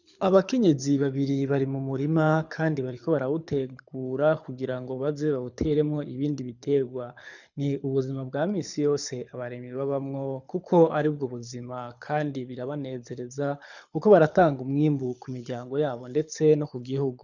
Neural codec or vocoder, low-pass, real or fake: codec, 24 kHz, 6 kbps, HILCodec; 7.2 kHz; fake